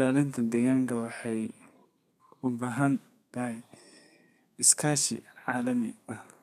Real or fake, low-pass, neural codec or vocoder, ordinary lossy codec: fake; 14.4 kHz; codec, 32 kHz, 1.9 kbps, SNAC; none